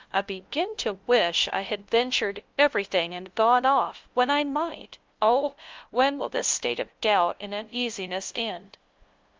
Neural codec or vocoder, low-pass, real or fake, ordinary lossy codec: codec, 16 kHz, 0.5 kbps, FunCodec, trained on LibriTTS, 25 frames a second; 7.2 kHz; fake; Opus, 32 kbps